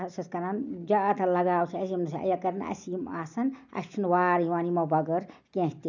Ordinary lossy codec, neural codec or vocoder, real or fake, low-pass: none; none; real; 7.2 kHz